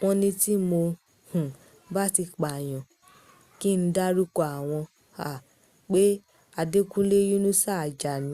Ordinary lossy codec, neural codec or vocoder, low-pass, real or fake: Opus, 64 kbps; none; 14.4 kHz; real